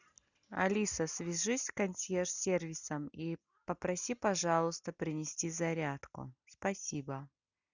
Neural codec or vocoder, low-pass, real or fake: none; 7.2 kHz; real